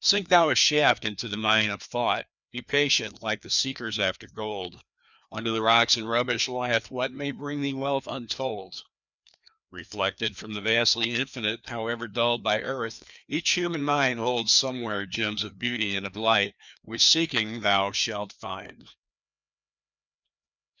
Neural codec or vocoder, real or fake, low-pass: codec, 16 kHz, 2 kbps, FreqCodec, larger model; fake; 7.2 kHz